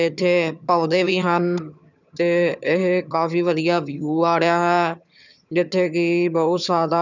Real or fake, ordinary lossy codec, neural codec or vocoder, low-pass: fake; none; vocoder, 22.05 kHz, 80 mel bands, HiFi-GAN; 7.2 kHz